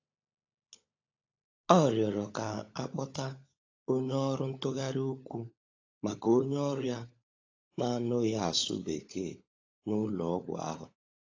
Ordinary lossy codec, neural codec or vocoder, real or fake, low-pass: AAC, 32 kbps; codec, 16 kHz, 16 kbps, FunCodec, trained on LibriTTS, 50 frames a second; fake; 7.2 kHz